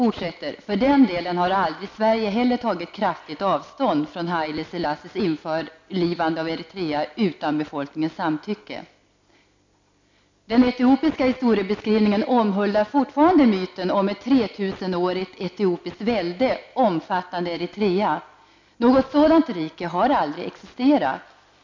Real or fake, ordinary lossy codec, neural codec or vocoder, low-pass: real; MP3, 64 kbps; none; 7.2 kHz